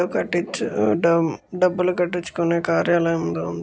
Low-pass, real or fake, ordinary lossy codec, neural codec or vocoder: none; real; none; none